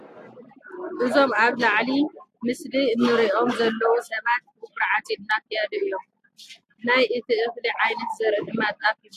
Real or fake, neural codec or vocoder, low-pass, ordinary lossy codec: real; none; 14.4 kHz; AAC, 64 kbps